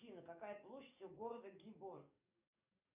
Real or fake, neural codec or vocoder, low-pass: fake; codec, 44.1 kHz, 7.8 kbps, DAC; 3.6 kHz